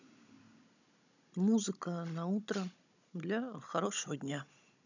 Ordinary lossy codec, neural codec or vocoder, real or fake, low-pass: none; codec, 16 kHz, 16 kbps, FunCodec, trained on Chinese and English, 50 frames a second; fake; 7.2 kHz